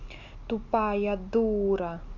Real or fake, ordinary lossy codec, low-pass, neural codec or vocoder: real; none; 7.2 kHz; none